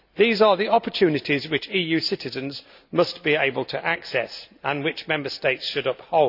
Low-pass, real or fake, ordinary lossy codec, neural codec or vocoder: 5.4 kHz; real; none; none